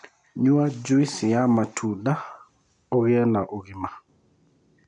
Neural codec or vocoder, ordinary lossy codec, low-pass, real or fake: none; none; 9.9 kHz; real